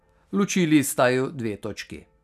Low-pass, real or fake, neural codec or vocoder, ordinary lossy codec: 14.4 kHz; real; none; none